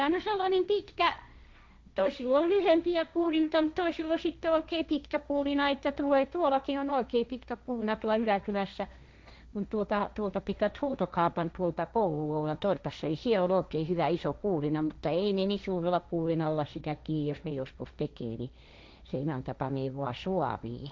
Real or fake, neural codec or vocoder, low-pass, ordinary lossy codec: fake; codec, 16 kHz, 1.1 kbps, Voila-Tokenizer; none; none